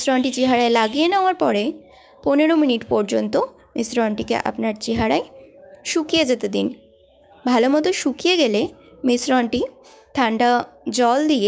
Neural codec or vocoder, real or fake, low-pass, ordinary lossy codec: codec, 16 kHz, 6 kbps, DAC; fake; none; none